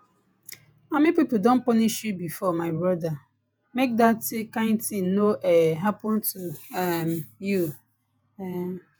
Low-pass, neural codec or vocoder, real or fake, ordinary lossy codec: none; vocoder, 48 kHz, 128 mel bands, Vocos; fake; none